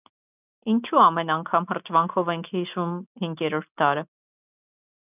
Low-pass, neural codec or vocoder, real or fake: 3.6 kHz; none; real